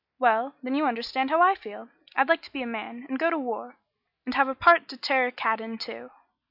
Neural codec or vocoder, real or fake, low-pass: none; real; 5.4 kHz